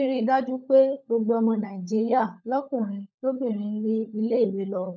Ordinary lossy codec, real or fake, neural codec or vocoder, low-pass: none; fake; codec, 16 kHz, 16 kbps, FunCodec, trained on LibriTTS, 50 frames a second; none